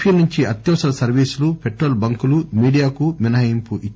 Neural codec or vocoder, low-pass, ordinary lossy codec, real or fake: none; none; none; real